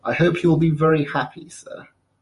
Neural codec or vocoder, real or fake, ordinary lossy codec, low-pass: vocoder, 48 kHz, 128 mel bands, Vocos; fake; MP3, 48 kbps; 14.4 kHz